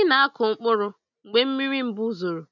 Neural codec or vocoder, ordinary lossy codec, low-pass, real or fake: none; none; 7.2 kHz; real